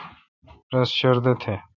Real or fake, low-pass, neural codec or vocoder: fake; 7.2 kHz; vocoder, 44.1 kHz, 128 mel bands every 512 samples, BigVGAN v2